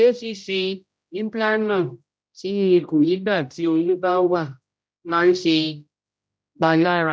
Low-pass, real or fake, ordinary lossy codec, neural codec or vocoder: none; fake; none; codec, 16 kHz, 0.5 kbps, X-Codec, HuBERT features, trained on general audio